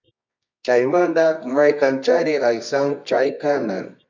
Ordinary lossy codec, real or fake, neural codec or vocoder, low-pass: MP3, 64 kbps; fake; codec, 24 kHz, 0.9 kbps, WavTokenizer, medium music audio release; 7.2 kHz